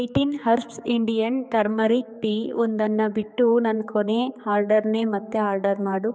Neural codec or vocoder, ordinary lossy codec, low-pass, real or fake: codec, 16 kHz, 4 kbps, X-Codec, HuBERT features, trained on general audio; none; none; fake